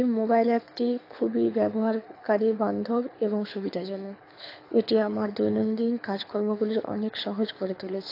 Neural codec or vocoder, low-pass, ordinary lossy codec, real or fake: codec, 24 kHz, 6 kbps, HILCodec; 5.4 kHz; AAC, 32 kbps; fake